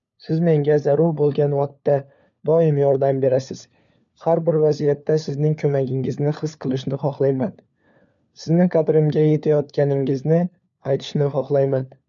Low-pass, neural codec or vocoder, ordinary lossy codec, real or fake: 7.2 kHz; codec, 16 kHz, 4 kbps, FunCodec, trained on LibriTTS, 50 frames a second; none; fake